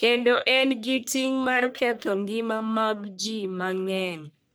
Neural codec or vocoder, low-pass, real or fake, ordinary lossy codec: codec, 44.1 kHz, 1.7 kbps, Pupu-Codec; none; fake; none